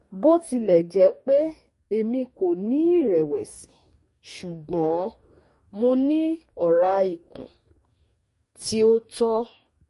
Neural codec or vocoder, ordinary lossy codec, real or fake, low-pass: codec, 44.1 kHz, 2.6 kbps, DAC; MP3, 48 kbps; fake; 14.4 kHz